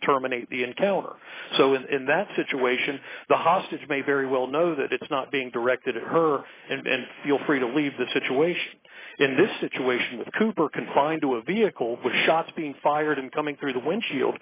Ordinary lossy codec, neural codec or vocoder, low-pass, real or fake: AAC, 16 kbps; none; 3.6 kHz; real